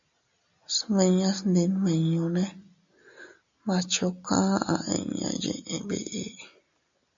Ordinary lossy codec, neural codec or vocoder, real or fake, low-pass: MP3, 64 kbps; none; real; 7.2 kHz